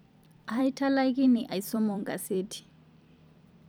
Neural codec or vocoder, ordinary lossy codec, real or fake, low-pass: vocoder, 44.1 kHz, 128 mel bands every 256 samples, BigVGAN v2; none; fake; none